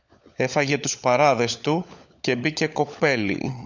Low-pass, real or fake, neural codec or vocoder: 7.2 kHz; fake; codec, 16 kHz, 16 kbps, FunCodec, trained on LibriTTS, 50 frames a second